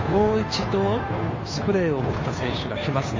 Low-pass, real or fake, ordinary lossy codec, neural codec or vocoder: 7.2 kHz; fake; MP3, 32 kbps; codec, 16 kHz, 2 kbps, FunCodec, trained on Chinese and English, 25 frames a second